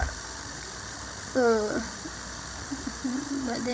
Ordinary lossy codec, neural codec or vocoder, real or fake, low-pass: none; codec, 16 kHz, 16 kbps, FreqCodec, larger model; fake; none